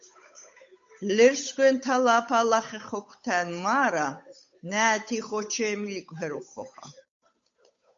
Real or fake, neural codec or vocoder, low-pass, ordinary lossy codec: fake; codec, 16 kHz, 8 kbps, FunCodec, trained on Chinese and English, 25 frames a second; 7.2 kHz; MP3, 48 kbps